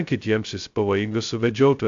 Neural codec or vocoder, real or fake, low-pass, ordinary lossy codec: codec, 16 kHz, 0.2 kbps, FocalCodec; fake; 7.2 kHz; AAC, 64 kbps